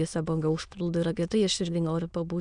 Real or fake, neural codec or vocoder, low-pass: fake; autoencoder, 22.05 kHz, a latent of 192 numbers a frame, VITS, trained on many speakers; 9.9 kHz